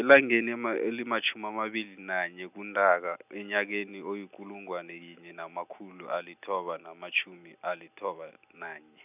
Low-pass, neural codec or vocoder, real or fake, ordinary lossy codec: 3.6 kHz; none; real; none